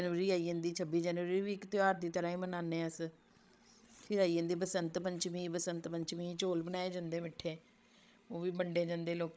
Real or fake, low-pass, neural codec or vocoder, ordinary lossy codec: fake; none; codec, 16 kHz, 16 kbps, FreqCodec, larger model; none